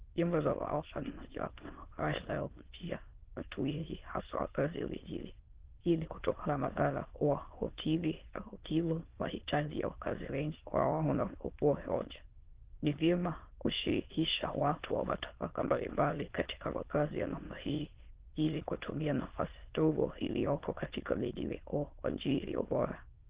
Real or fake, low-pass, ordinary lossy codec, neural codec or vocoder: fake; 3.6 kHz; Opus, 16 kbps; autoencoder, 22.05 kHz, a latent of 192 numbers a frame, VITS, trained on many speakers